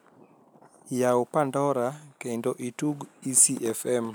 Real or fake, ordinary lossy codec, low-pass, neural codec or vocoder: real; none; none; none